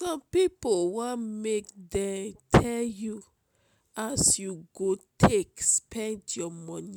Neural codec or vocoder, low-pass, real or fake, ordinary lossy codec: none; none; real; none